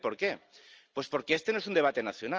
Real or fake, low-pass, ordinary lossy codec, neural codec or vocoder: real; 7.2 kHz; Opus, 24 kbps; none